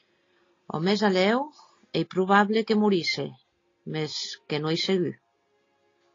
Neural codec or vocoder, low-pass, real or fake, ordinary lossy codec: none; 7.2 kHz; real; AAC, 32 kbps